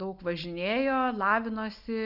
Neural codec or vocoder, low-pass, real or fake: none; 5.4 kHz; real